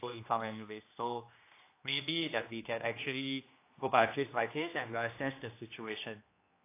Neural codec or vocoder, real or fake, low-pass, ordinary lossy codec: codec, 16 kHz, 1 kbps, X-Codec, HuBERT features, trained on general audio; fake; 3.6 kHz; AAC, 24 kbps